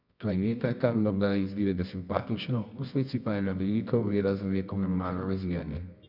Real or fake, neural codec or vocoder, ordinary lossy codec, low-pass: fake; codec, 24 kHz, 0.9 kbps, WavTokenizer, medium music audio release; none; 5.4 kHz